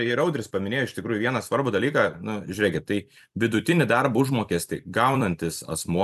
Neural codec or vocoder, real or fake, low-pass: vocoder, 44.1 kHz, 128 mel bands every 256 samples, BigVGAN v2; fake; 14.4 kHz